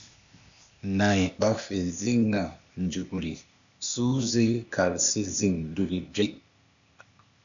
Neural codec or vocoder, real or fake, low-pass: codec, 16 kHz, 0.8 kbps, ZipCodec; fake; 7.2 kHz